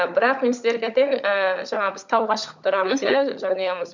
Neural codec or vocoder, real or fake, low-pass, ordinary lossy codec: codec, 16 kHz, 16 kbps, FunCodec, trained on LibriTTS, 50 frames a second; fake; 7.2 kHz; none